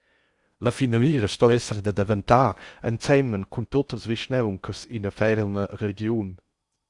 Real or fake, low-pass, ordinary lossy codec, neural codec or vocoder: fake; 10.8 kHz; Opus, 64 kbps; codec, 16 kHz in and 24 kHz out, 0.6 kbps, FocalCodec, streaming, 4096 codes